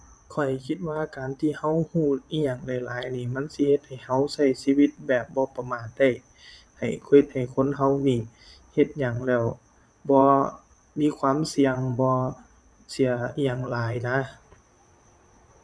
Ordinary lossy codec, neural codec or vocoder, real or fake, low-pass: none; vocoder, 22.05 kHz, 80 mel bands, Vocos; fake; none